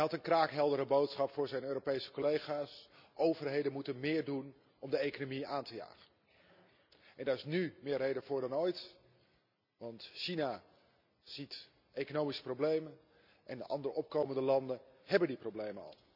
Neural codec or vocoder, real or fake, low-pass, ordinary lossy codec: none; real; 5.4 kHz; none